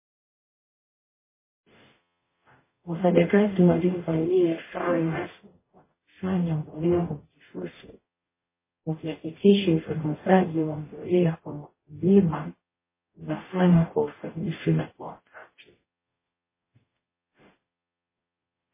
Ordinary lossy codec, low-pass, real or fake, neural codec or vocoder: MP3, 16 kbps; 3.6 kHz; fake; codec, 44.1 kHz, 0.9 kbps, DAC